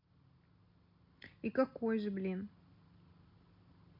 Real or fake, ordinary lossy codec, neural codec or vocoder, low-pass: real; none; none; 5.4 kHz